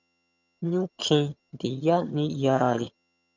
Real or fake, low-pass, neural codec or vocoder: fake; 7.2 kHz; vocoder, 22.05 kHz, 80 mel bands, HiFi-GAN